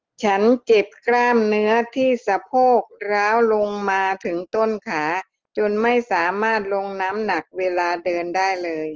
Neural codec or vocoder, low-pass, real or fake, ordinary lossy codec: none; 7.2 kHz; real; Opus, 16 kbps